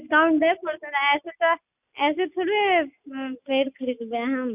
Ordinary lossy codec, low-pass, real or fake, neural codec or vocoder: none; 3.6 kHz; real; none